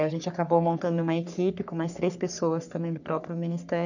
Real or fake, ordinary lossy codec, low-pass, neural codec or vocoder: fake; none; 7.2 kHz; codec, 44.1 kHz, 3.4 kbps, Pupu-Codec